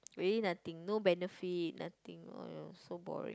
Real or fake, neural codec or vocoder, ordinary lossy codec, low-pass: real; none; none; none